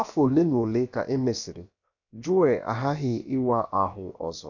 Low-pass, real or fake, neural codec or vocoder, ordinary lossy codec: 7.2 kHz; fake; codec, 16 kHz, about 1 kbps, DyCAST, with the encoder's durations; none